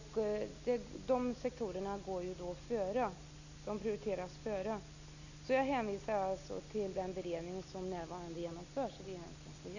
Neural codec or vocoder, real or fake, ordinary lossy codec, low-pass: none; real; none; 7.2 kHz